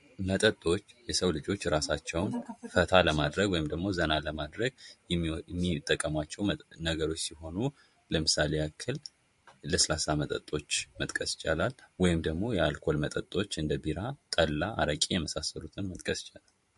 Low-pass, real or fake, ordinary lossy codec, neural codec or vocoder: 14.4 kHz; fake; MP3, 48 kbps; vocoder, 44.1 kHz, 128 mel bands every 512 samples, BigVGAN v2